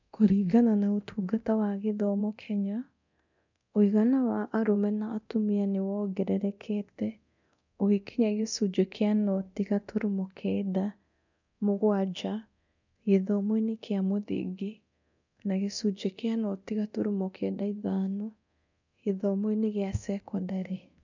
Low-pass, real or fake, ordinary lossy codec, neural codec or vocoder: 7.2 kHz; fake; none; codec, 24 kHz, 0.9 kbps, DualCodec